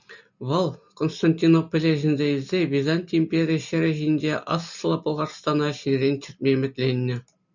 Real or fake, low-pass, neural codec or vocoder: real; 7.2 kHz; none